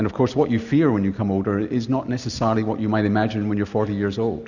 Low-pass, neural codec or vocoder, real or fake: 7.2 kHz; none; real